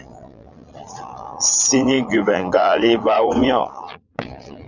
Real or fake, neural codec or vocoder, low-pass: fake; vocoder, 22.05 kHz, 80 mel bands, Vocos; 7.2 kHz